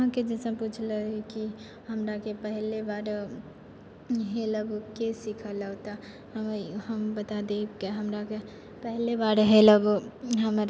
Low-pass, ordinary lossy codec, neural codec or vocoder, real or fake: none; none; none; real